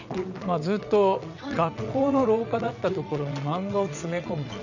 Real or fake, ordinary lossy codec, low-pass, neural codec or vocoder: fake; none; 7.2 kHz; vocoder, 22.05 kHz, 80 mel bands, WaveNeXt